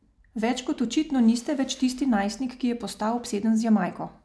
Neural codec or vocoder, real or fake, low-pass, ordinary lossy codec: none; real; none; none